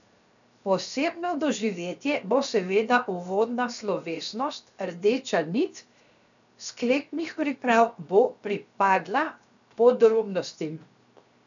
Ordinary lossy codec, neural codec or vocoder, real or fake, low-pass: MP3, 96 kbps; codec, 16 kHz, 0.7 kbps, FocalCodec; fake; 7.2 kHz